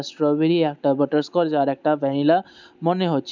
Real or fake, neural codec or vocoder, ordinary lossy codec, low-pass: real; none; none; 7.2 kHz